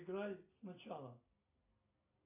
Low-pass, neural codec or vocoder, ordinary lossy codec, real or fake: 3.6 kHz; none; AAC, 32 kbps; real